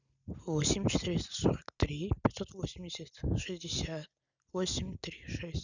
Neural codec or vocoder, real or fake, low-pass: none; real; 7.2 kHz